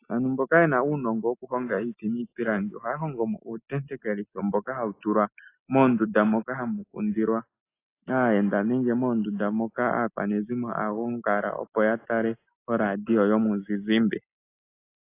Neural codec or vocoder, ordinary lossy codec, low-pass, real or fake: none; AAC, 24 kbps; 3.6 kHz; real